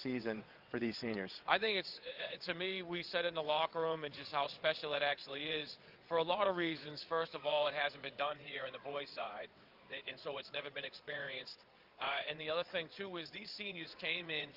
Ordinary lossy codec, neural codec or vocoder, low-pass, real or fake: Opus, 32 kbps; vocoder, 22.05 kHz, 80 mel bands, WaveNeXt; 5.4 kHz; fake